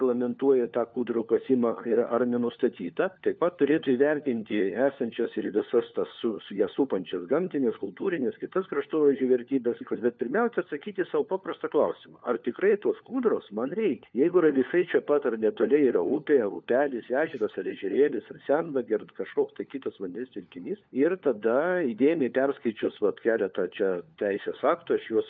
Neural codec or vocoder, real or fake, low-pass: codec, 16 kHz, 4 kbps, FunCodec, trained on LibriTTS, 50 frames a second; fake; 7.2 kHz